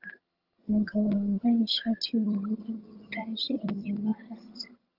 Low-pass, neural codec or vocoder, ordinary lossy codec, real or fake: 5.4 kHz; vocoder, 22.05 kHz, 80 mel bands, HiFi-GAN; Opus, 24 kbps; fake